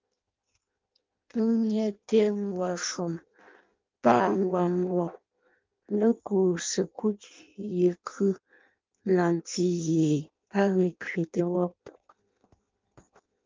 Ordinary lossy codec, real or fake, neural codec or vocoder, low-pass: Opus, 32 kbps; fake; codec, 16 kHz in and 24 kHz out, 0.6 kbps, FireRedTTS-2 codec; 7.2 kHz